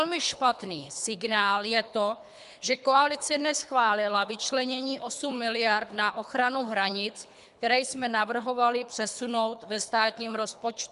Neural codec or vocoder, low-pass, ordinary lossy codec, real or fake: codec, 24 kHz, 3 kbps, HILCodec; 10.8 kHz; MP3, 96 kbps; fake